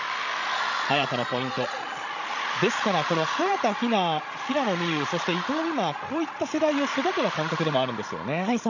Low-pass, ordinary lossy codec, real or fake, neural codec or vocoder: 7.2 kHz; none; fake; codec, 16 kHz, 16 kbps, FreqCodec, larger model